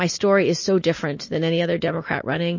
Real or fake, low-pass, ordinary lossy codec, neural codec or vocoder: real; 7.2 kHz; MP3, 32 kbps; none